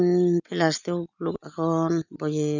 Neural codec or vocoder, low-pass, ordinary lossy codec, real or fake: none; 7.2 kHz; none; real